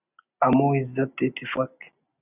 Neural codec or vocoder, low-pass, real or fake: none; 3.6 kHz; real